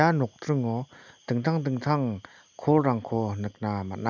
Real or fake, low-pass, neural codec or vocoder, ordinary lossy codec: real; 7.2 kHz; none; none